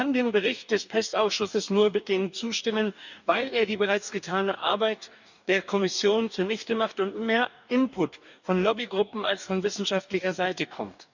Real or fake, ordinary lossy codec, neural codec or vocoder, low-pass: fake; none; codec, 44.1 kHz, 2.6 kbps, DAC; 7.2 kHz